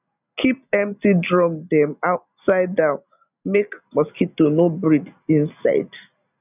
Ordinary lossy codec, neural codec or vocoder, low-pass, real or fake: none; none; 3.6 kHz; real